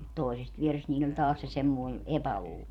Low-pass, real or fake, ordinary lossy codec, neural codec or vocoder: 19.8 kHz; fake; none; vocoder, 44.1 kHz, 128 mel bands every 512 samples, BigVGAN v2